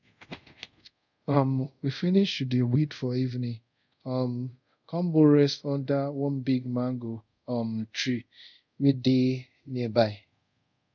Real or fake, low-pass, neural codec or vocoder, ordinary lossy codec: fake; 7.2 kHz; codec, 24 kHz, 0.5 kbps, DualCodec; none